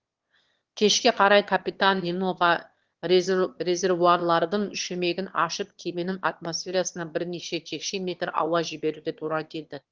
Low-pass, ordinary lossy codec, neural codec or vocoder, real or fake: 7.2 kHz; Opus, 16 kbps; autoencoder, 22.05 kHz, a latent of 192 numbers a frame, VITS, trained on one speaker; fake